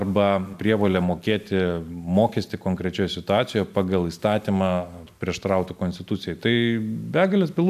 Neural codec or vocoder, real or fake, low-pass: none; real; 14.4 kHz